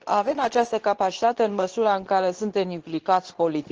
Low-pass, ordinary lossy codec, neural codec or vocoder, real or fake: 7.2 kHz; Opus, 16 kbps; codec, 24 kHz, 0.9 kbps, WavTokenizer, medium speech release version 2; fake